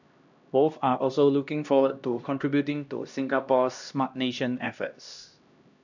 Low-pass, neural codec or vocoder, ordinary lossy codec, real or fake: 7.2 kHz; codec, 16 kHz, 1 kbps, X-Codec, HuBERT features, trained on LibriSpeech; none; fake